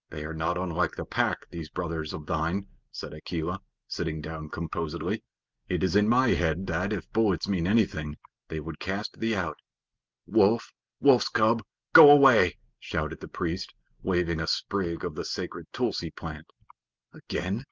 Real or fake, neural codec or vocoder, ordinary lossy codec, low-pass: real; none; Opus, 32 kbps; 7.2 kHz